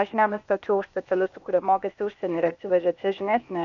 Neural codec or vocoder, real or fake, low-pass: codec, 16 kHz, 0.8 kbps, ZipCodec; fake; 7.2 kHz